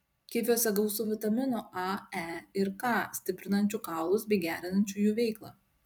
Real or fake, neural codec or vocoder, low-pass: fake; vocoder, 44.1 kHz, 128 mel bands every 256 samples, BigVGAN v2; 19.8 kHz